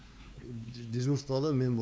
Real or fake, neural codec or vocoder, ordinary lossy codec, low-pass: fake; codec, 16 kHz, 4 kbps, X-Codec, WavLM features, trained on Multilingual LibriSpeech; none; none